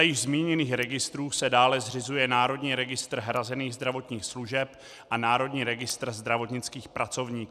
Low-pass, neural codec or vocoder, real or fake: 14.4 kHz; none; real